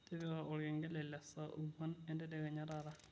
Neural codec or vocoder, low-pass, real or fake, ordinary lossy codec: none; none; real; none